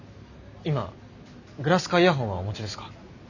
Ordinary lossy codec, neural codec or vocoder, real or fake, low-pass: none; none; real; 7.2 kHz